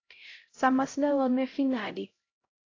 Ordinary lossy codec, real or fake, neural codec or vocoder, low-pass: AAC, 32 kbps; fake; codec, 16 kHz, 0.5 kbps, X-Codec, HuBERT features, trained on LibriSpeech; 7.2 kHz